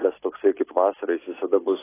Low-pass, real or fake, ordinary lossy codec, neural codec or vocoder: 3.6 kHz; real; AAC, 16 kbps; none